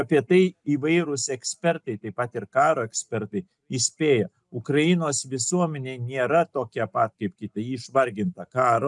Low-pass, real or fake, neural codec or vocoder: 10.8 kHz; real; none